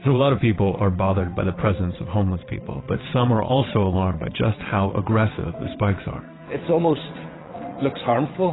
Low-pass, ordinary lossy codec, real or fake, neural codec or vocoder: 7.2 kHz; AAC, 16 kbps; fake; vocoder, 22.05 kHz, 80 mel bands, WaveNeXt